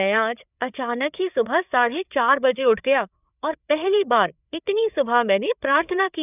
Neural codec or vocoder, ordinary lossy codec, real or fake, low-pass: codec, 16 kHz, 4 kbps, FreqCodec, larger model; none; fake; 3.6 kHz